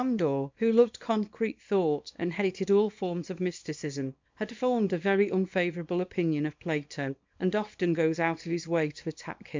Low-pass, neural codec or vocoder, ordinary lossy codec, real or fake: 7.2 kHz; codec, 24 kHz, 0.9 kbps, WavTokenizer, small release; MP3, 64 kbps; fake